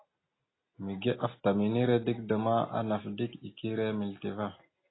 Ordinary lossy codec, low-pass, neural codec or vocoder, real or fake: AAC, 16 kbps; 7.2 kHz; none; real